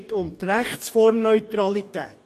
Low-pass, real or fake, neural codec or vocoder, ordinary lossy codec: 14.4 kHz; fake; codec, 44.1 kHz, 2.6 kbps, DAC; MP3, 64 kbps